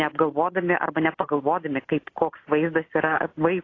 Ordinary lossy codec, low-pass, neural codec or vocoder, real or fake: AAC, 32 kbps; 7.2 kHz; none; real